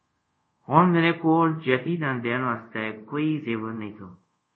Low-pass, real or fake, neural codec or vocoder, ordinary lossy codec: 9.9 kHz; fake; codec, 24 kHz, 0.5 kbps, DualCodec; MP3, 32 kbps